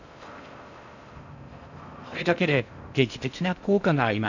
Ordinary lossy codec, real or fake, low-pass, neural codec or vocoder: none; fake; 7.2 kHz; codec, 16 kHz in and 24 kHz out, 0.6 kbps, FocalCodec, streaming, 4096 codes